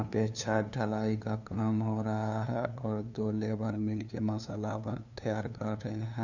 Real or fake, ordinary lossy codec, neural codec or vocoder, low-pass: fake; none; codec, 16 kHz, 2 kbps, FunCodec, trained on LibriTTS, 25 frames a second; 7.2 kHz